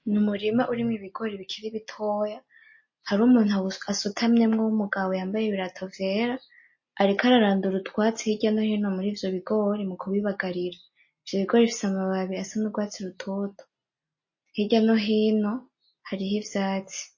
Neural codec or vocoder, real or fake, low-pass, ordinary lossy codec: none; real; 7.2 kHz; MP3, 32 kbps